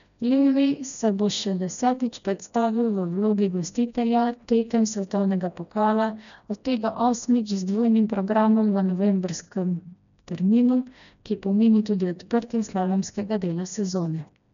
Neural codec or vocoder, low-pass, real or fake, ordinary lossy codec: codec, 16 kHz, 1 kbps, FreqCodec, smaller model; 7.2 kHz; fake; none